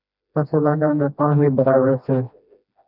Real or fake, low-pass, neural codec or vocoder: fake; 5.4 kHz; codec, 16 kHz, 1 kbps, FreqCodec, smaller model